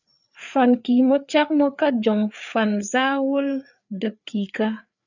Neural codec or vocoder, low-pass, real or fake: codec, 16 kHz, 4 kbps, FreqCodec, larger model; 7.2 kHz; fake